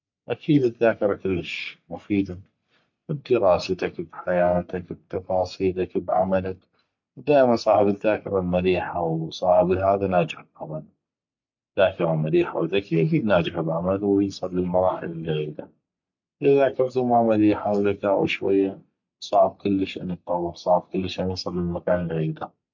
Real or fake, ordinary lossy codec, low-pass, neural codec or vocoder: fake; MP3, 48 kbps; 7.2 kHz; codec, 44.1 kHz, 3.4 kbps, Pupu-Codec